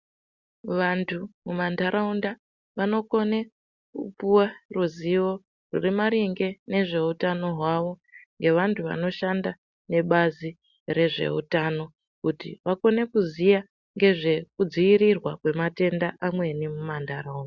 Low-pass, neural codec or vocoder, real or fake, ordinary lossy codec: 7.2 kHz; none; real; Opus, 64 kbps